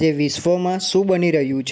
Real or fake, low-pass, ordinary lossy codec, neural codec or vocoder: real; none; none; none